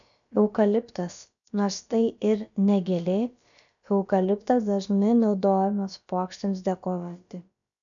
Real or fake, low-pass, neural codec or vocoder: fake; 7.2 kHz; codec, 16 kHz, about 1 kbps, DyCAST, with the encoder's durations